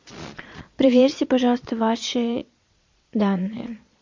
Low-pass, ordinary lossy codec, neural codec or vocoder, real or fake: 7.2 kHz; MP3, 48 kbps; vocoder, 22.05 kHz, 80 mel bands, Vocos; fake